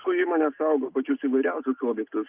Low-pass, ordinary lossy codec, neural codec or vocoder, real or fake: 3.6 kHz; Opus, 32 kbps; none; real